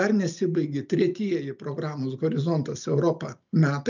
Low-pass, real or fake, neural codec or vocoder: 7.2 kHz; real; none